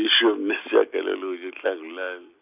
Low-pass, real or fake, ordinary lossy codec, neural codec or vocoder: 3.6 kHz; real; none; none